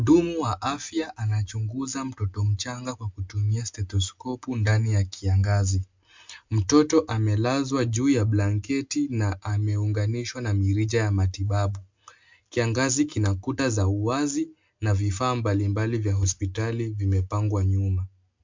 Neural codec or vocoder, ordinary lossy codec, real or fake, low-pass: none; MP3, 64 kbps; real; 7.2 kHz